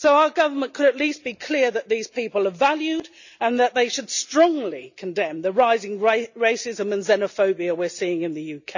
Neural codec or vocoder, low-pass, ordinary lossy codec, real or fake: none; 7.2 kHz; none; real